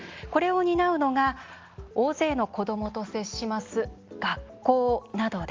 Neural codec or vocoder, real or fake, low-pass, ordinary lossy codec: none; real; 7.2 kHz; Opus, 32 kbps